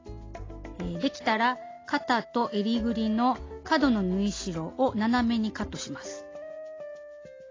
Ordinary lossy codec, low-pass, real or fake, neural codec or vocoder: AAC, 32 kbps; 7.2 kHz; real; none